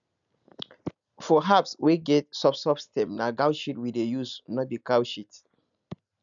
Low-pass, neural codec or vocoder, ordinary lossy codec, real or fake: 7.2 kHz; none; none; real